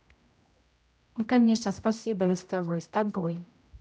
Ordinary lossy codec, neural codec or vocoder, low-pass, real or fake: none; codec, 16 kHz, 0.5 kbps, X-Codec, HuBERT features, trained on general audio; none; fake